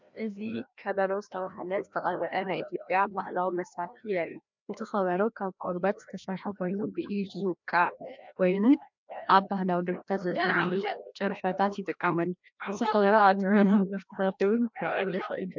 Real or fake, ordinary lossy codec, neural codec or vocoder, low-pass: fake; MP3, 64 kbps; codec, 16 kHz, 1 kbps, FreqCodec, larger model; 7.2 kHz